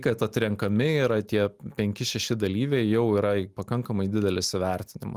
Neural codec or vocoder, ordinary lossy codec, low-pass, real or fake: none; Opus, 32 kbps; 14.4 kHz; real